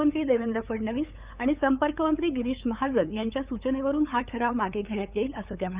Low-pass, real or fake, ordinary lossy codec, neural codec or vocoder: 3.6 kHz; fake; Opus, 64 kbps; codec, 16 kHz, 8 kbps, FunCodec, trained on LibriTTS, 25 frames a second